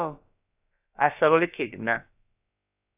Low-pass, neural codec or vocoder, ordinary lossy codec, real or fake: 3.6 kHz; codec, 16 kHz, about 1 kbps, DyCAST, with the encoder's durations; AAC, 32 kbps; fake